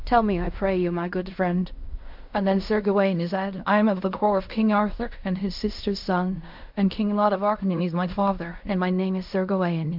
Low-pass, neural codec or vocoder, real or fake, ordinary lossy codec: 5.4 kHz; codec, 16 kHz in and 24 kHz out, 0.4 kbps, LongCat-Audio-Codec, fine tuned four codebook decoder; fake; MP3, 48 kbps